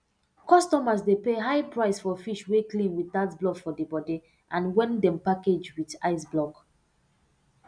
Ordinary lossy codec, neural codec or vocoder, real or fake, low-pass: none; none; real; 9.9 kHz